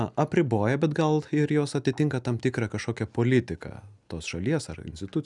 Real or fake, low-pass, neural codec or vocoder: real; 10.8 kHz; none